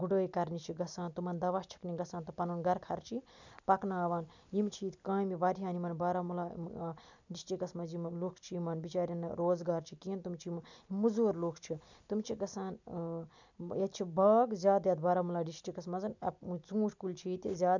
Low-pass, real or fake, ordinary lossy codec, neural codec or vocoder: 7.2 kHz; real; none; none